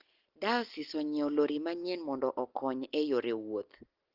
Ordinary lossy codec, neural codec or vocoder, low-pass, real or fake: Opus, 16 kbps; none; 5.4 kHz; real